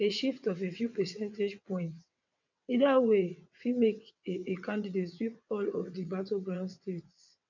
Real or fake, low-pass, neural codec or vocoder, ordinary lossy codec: fake; 7.2 kHz; vocoder, 22.05 kHz, 80 mel bands, WaveNeXt; none